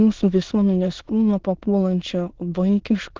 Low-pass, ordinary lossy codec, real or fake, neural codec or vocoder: 7.2 kHz; Opus, 16 kbps; fake; autoencoder, 22.05 kHz, a latent of 192 numbers a frame, VITS, trained on many speakers